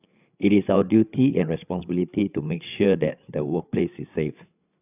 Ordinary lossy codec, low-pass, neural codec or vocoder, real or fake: none; 3.6 kHz; codec, 16 kHz, 8 kbps, FreqCodec, larger model; fake